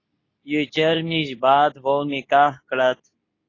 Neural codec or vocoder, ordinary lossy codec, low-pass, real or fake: codec, 24 kHz, 0.9 kbps, WavTokenizer, medium speech release version 2; AAC, 32 kbps; 7.2 kHz; fake